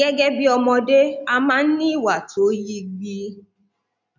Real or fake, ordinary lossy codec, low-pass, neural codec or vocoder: real; none; 7.2 kHz; none